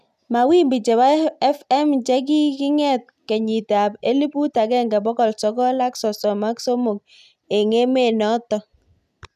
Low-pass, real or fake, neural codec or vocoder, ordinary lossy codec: 14.4 kHz; real; none; none